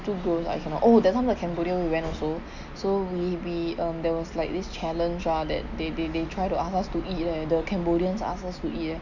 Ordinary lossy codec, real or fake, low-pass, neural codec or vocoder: none; real; 7.2 kHz; none